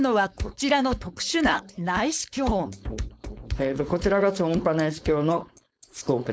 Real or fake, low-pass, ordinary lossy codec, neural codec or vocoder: fake; none; none; codec, 16 kHz, 4.8 kbps, FACodec